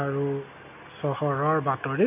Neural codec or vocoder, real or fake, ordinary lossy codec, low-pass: none; real; none; 3.6 kHz